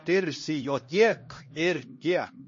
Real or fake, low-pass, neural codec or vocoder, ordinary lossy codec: fake; 7.2 kHz; codec, 16 kHz, 1 kbps, X-Codec, HuBERT features, trained on LibriSpeech; MP3, 32 kbps